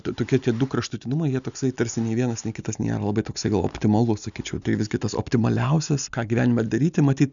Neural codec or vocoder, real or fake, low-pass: none; real; 7.2 kHz